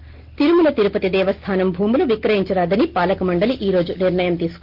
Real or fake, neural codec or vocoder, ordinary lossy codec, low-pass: real; none; Opus, 16 kbps; 5.4 kHz